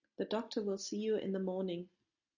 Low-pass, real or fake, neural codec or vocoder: 7.2 kHz; real; none